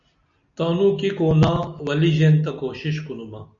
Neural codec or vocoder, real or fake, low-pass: none; real; 7.2 kHz